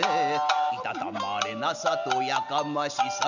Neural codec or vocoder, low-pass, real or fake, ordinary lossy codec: none; 7.2 kHz; real; none